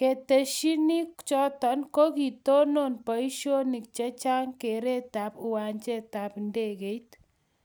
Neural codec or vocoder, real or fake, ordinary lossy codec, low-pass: none; real; none; none